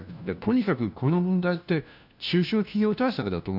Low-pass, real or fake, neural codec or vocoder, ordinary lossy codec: 5.4 kHz; fake; codec, 16 kHz, 1 kbps, FunCodec, trained on LibriTTS, 50 frames a second; none